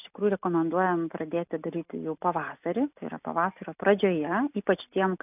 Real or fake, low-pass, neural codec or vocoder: real; 3.6 kHz; none